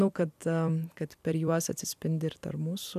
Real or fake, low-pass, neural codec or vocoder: fake; 14.4 kHz; vocoder, 44.1 kHz, 128 mel bands every 256 samples, BigVGAN v2